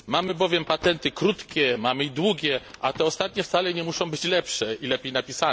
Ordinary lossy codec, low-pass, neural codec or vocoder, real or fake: none; none; none; real